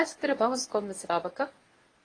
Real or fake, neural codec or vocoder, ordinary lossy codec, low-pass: fake; codec, 24 kHz, 0.9 kbps, WavTokenizer, medium speech release version 1; AAC, 32 kbps; 9.9 kHz